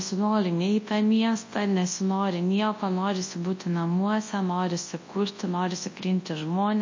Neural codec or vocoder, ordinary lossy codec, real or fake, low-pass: codec, 24 kHz, 0.9 kbps, WavTokenizer, large speech release; MP3, 32 kbps; fake; 7.2 kHz